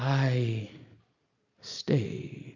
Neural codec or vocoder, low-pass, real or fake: none; 7.2 kHz; real